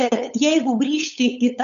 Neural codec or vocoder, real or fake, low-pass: codec, 16 kHz, 8 kbps, FunCodec, trained on LibriTTS, 25 frames a second; fake; 7.2 kHz